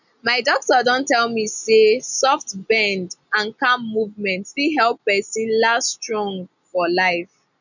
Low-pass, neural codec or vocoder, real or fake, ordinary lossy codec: 7.2 kHz; none; real; none